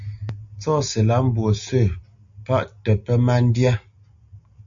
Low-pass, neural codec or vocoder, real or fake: 7.2 kHz; none; real